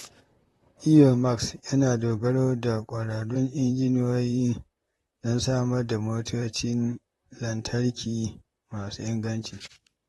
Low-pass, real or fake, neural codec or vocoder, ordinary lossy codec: 19.8 kHz; fake; vocoder, 44.1 kHz, 128 mel bands, Pupu-Vocoder; AAC, 32 kbps